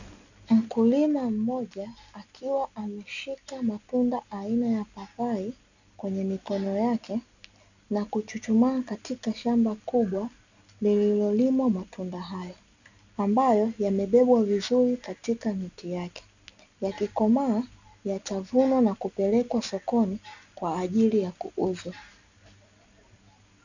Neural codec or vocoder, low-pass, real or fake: none; 7.2 kHz; real